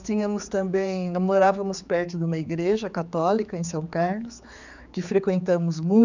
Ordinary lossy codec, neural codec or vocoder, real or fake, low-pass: none; codec, 16 kHz, 4 kbps, X-Codec, HuBERT features, trained on general audio; fake; 7.2 kHz